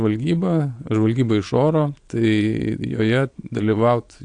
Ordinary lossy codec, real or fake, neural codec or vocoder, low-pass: AAC, 64 kbps; fake; vocoder, 22.05 kHz, 80 mel bands, Vocos; 9.9 kHz